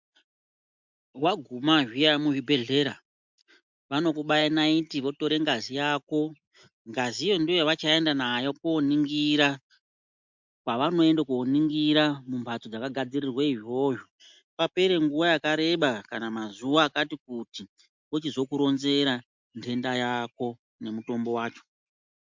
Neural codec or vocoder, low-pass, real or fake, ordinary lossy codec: none; 7.2 kHz; real; MP3, 64 kbps